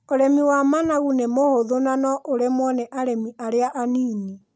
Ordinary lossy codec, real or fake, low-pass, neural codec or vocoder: none; real; none; none